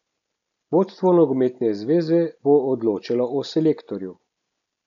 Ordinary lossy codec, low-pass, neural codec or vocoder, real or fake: none; 7.2 kHz; none; real